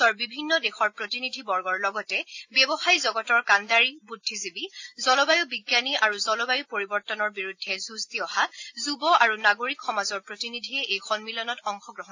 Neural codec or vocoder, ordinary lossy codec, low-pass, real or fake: none; AAC, 48 kbps; 7.2 kHz; real